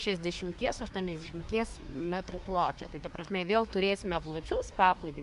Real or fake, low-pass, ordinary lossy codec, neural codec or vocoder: fake; 10.8 kHz; AAC, 96 kbps; codec, 24 kHz, 1 kbps, SNAC